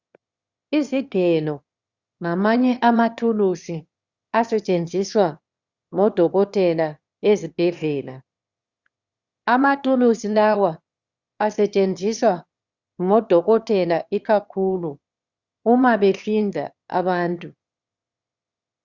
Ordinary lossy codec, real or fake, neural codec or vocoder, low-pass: Opus, 64 kbps; fake; autoencoder, 22.05 kHz, a latent of 192 numbers a frame, VITS, trained on one speaker; 7.2 kHz